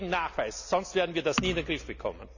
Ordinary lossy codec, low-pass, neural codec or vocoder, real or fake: none; 7.2 kHz; none; real